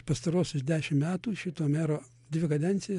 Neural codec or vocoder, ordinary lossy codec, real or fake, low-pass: none; MP3, 64 kbps; real; 10.8 kHz